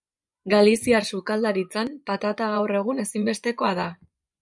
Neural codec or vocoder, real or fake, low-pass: vocoder, 24 kHz, 100 mel bands, Vocos; fake; 10.8 kHz